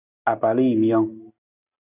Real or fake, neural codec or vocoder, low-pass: real; none; 3.6 kHz